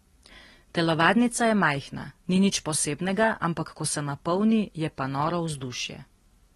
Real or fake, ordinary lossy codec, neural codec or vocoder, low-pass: real; AAC, 32 kbps; none; 19.8 kHz